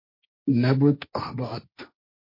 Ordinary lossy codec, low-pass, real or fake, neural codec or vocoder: MP3, 32 kbps; 5.4 kHz; fake; codec, 16 kHz, 1.1 kbps, Voila-Tokenizer